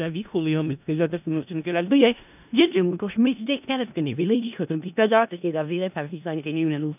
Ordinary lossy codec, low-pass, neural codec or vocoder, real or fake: none; 3.6 kHz; codec, 16 kHz in and 24 kHz out, 0.4 kbps, LongCat-Audio-Codec, four codebook decoder; fake